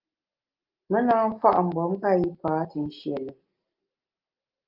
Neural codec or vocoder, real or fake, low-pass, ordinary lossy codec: none; real; 5.4 kHz; Opus, 24 kbps